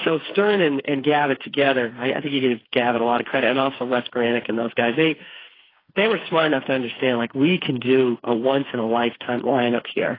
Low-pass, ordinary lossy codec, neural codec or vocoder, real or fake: 5.4 kHz; AAC, 24 kbps; codec, 16 kHz, 4 kbps, FreqCodec, smaller model; fake